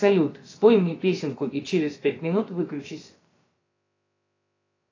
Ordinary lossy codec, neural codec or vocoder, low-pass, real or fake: AAC, 32 kbps; codec, 16 kHz, about 1 kbps, DyCAST, with the encoder's durations; 7.2 kHz; fake